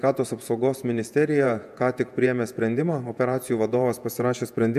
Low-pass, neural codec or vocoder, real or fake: 14.4 kHz; vocoder, 48 kHz, 128 mel bands, Vocos; fake